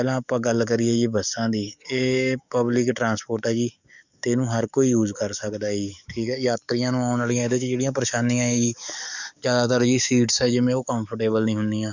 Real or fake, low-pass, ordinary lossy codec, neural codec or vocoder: fake; 7.2 kHz; none; codec, 44.1 kHz, 7.8 kbps, DAC